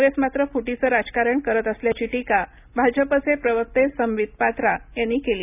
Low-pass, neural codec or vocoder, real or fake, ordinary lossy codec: 3.6 kHz; none; real; none